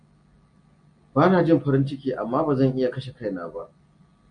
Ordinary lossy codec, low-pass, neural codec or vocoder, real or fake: AAC, 64 kbps; 9.9 kHz; none; real